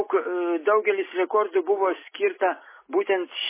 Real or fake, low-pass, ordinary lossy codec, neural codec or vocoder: real; 3.6 kHz; MP3, 16 kbps; none